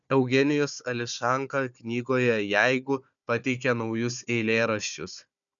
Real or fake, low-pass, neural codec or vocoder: fake; 7.2 kHz; codec, 16 kHz, 4 kbps, FunCodec, trained on Chinese and English, 50 frames a second